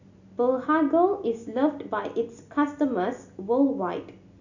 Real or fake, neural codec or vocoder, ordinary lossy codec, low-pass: real; none; none; 7.2 kHz